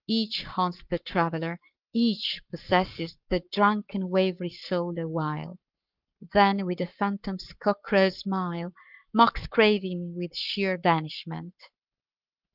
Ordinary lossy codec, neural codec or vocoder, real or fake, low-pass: Opus, 24 kbps; codec, 44.1 kHz, 7.8 kbps, Pupu-Codec; fake; 5.4 kHz